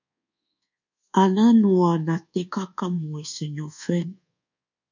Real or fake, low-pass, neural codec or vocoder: fake; 7.2 kHz; codec, 24 kHz, 1.2 kbps, DualCodec